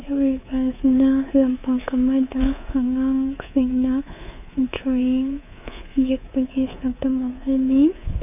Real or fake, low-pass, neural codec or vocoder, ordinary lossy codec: fake; 3.6 kHz; codec, 24 kHz, 3.1 kbps, DualCodec; none